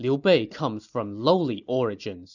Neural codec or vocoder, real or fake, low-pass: none; real; 7.2 kHz